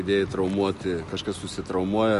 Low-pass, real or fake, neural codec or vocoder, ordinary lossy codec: 14.4 kHz; real; none; MP3, 48 kbps